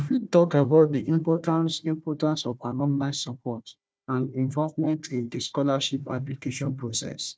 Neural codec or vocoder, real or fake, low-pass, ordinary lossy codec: codec, 16 kHz, 1 kbps, FunCodec, trained on Chinese and English, 50 frames a second; fake; none; none